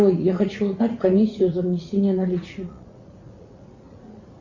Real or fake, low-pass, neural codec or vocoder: fake; 7.2 kHz; vocoder, 44.1 kHz, 80 mel bands, Vocos